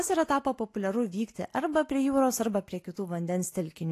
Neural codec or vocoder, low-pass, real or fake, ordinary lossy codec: none; 14.4 kHz; real; AAC, 48 kbps